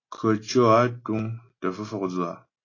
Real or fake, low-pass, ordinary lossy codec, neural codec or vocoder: real; 7.2 kHz; AAC, 32 kbps; none